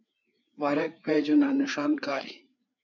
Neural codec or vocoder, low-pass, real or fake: codec, 16 kHz, 4 kbps, FreqCodec, larger model; 7.2 kHz; fake